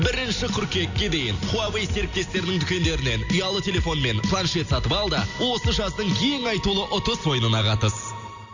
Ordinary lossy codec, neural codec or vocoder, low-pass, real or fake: none; none; 7.2 kHz; real